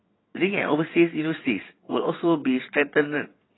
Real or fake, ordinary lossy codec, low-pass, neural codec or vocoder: fake; AAC, 16 kbps; 7.2 kHz; vocoder, 22.05 kHz, 80 mel bands, WaveNeXt